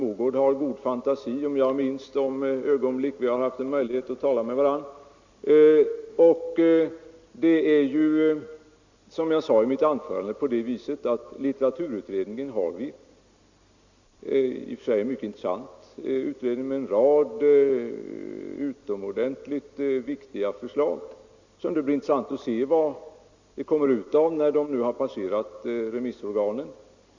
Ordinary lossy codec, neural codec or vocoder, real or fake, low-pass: Opus, 64 kbps; none; real; 7.2 kHz